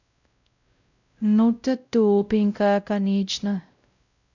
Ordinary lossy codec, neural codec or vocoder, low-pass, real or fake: none; codec, 16 kHz, 0.5 kbps, X-Codec, WavLM features, trained on Multilingual LibriSpeech; 7.2 kHz; fake